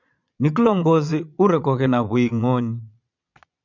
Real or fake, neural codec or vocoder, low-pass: fake; vocoder, 22.05 kHz, 80 mel bands, Vocos; 7.2 kHz